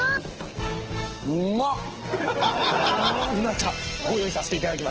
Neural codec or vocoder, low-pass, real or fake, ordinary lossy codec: codec, 44.1 kHz, 7.8 kbps, DAC; 7.2 kHz; fake; Opus, 16 kbps